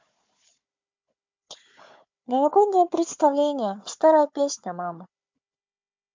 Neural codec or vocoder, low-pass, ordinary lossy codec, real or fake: codec, 16 kHz, 4 kbps, FunCodec, trained on Chinese and English, 50 frames a second; 7.2 kHz; MP3, 64 kbps; fake